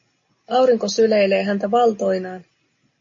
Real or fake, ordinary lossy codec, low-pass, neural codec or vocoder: real; MP3, 32 kbps; 7.2 kHz; none